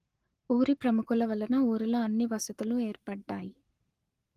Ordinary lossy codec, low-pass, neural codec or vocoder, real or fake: Opus, 24 kbps; 14.4 kHz; codec, 44.1 kHz, 7.8 kbps, Pupu-Codec; fake